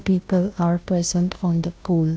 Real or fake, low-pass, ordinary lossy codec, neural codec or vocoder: fake; none; none; codec, 16 kHz, 0.5 kbps, FunCodec, trained on Chinese and English, 25 frames a second